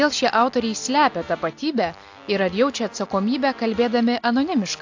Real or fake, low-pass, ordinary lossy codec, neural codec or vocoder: real; 7.2 kHz; AAC, 48 kbps; none